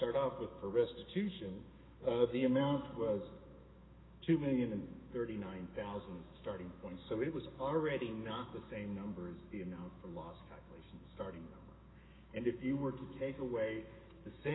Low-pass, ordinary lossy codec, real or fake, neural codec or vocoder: 7.2 kHz; AAC, 16 kbps; fake; autoencoder, 48 kHz, 128 numbers a frame, DAC-VAE, trained on Japanese speech